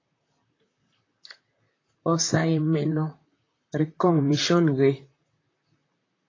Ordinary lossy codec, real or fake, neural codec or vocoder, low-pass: AAC, 32 kbps; fake; vocoder, 44.1 kHz, 128 mel bands, Pupu-Vocoder; 7.2 kHz